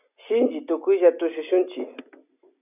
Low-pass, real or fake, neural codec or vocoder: 3.6 kHz; real; none